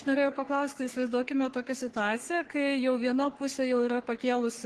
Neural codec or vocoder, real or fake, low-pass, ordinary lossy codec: codec, 44.1 kHz, 3.4 kbps, Pupu-Codec; fake; 10.8 kHz; Opus, 16 kbps